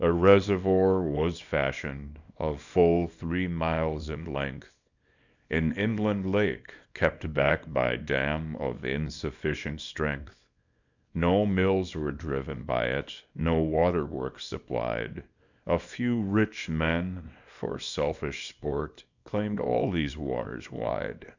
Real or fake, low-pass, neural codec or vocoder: fake; 7.2 kHz; codec, 24 kHz, 0.9 kbps, WavTokenizer, small release